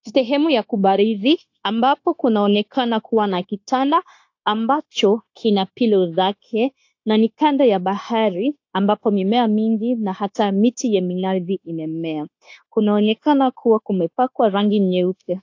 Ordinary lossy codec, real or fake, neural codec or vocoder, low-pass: AAC, 48 kbps; fake; codec, 16 kHz, 0.9 kbps, LongCat-Audio-Codec; 7.2 kHz